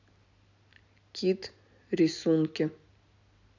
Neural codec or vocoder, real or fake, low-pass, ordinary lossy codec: none; real; 7.2 kHz; none